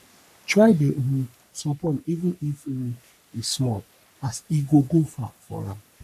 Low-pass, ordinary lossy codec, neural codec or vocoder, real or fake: 14.4 kHz; none; codec, 44.1 kHz, 3.4 kbps, Pupu-Codec; fake